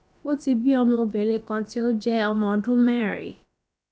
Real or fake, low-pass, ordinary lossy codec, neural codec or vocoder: fake; none; none; codec, 16 kHz, about 1 kbps, DyCAST, with the encoder's durations